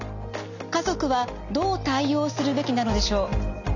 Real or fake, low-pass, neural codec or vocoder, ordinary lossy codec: real; 7.2 kHz; none; none